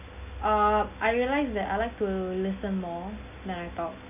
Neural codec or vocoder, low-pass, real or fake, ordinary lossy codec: none; 3.6 kHz; real; none